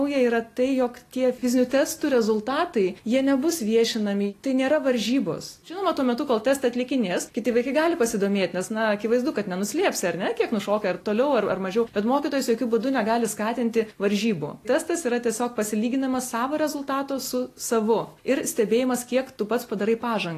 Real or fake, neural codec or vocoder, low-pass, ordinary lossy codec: real; none; 14.4 kHz; AAC, 48 kbps